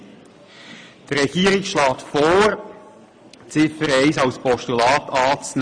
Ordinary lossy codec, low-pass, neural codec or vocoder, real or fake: AAC, 64 kbps; 9.9 kHz; none; real